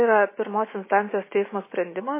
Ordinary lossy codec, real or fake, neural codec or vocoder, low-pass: MP3, 16 kbps; real; none; 3.6 kHz